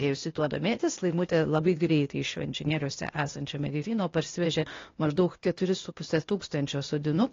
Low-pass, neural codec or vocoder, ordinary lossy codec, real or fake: 7.2 kHz; codec, 16 kHz, 0.8 kbps, ZipCodec; AAC, 32 kbps; fake